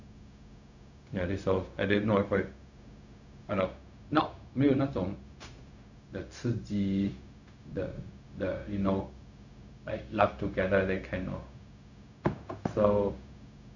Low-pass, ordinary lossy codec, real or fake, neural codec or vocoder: 7.2 kHz; none; fake; codec, 16 kHz, 0.4 kbps, LongCat-Audio-Codec